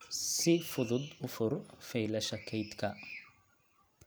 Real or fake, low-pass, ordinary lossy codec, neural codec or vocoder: real; none; none; none